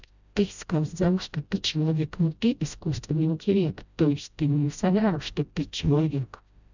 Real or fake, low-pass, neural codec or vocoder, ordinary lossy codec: fake; 7.2 kHz; codec, 16 kHz, 0.5 kbps, FreqCodec, smaller model; none